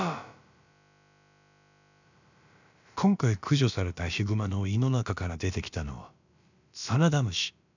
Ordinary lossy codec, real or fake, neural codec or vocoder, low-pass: none; fake; codec, 16 kHz, about 1 kbps, DyCAST, with the encoder's durations; 7.2 kHz